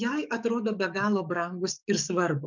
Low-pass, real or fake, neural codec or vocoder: 7.2 kHz; fake; codec, 44.1 kHz, 7.8 kbps, DAC